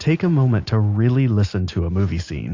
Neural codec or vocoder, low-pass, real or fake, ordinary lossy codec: none; 7.2 kHz; real; AAC, 48 kbps